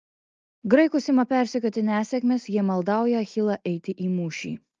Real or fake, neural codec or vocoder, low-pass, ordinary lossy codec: real; none; 7.2 kHz; Opus, 24 kbps